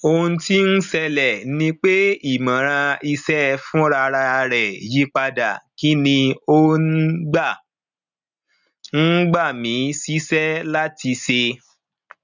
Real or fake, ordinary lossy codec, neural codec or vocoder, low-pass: real; none; none; 7.2 kHz